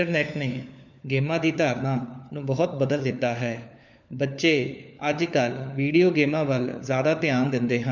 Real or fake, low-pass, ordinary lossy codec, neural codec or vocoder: fake; 7.2 kHz; none; codec, 16 kHz, 4 kbps, FunCodec, trained on LibriTTS, 50 frames a second